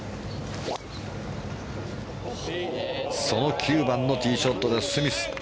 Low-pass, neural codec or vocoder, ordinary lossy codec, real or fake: none; none; none; real